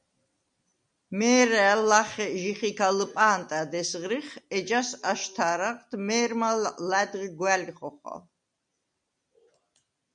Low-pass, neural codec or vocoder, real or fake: 9.9 kHz; none; real